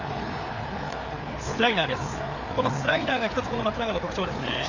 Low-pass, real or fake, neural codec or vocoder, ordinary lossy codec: 7.2 kHz; fake; codec, 16 kHz, 4 kbps, FreqCodec, larger model; none